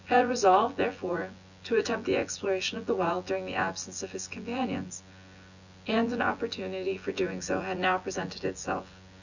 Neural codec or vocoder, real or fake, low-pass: vocoder, 24 kHz, 100 mel bands, Vocos; fake; 7.2 kHz